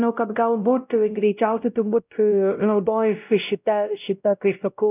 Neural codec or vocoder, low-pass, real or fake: codec, 16 kHz, 0.5 kbps, X-Codec, WavLM features, trained on Multilingual LibriSpeech; 3.6 kHz; fake